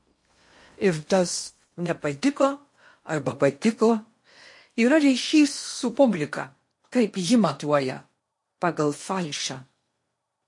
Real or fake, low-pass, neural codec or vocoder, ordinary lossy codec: fake; 10.8 kHz; codec, 16 kHz in and 24 kHz out, 0.8 kbps, FocalCodec, streaming, 65536 codes; MP3, 48 kbps